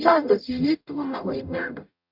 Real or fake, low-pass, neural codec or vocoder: fake; 5.4 kHz; codec, 44.1 kHz, 0.9 kbps, DAC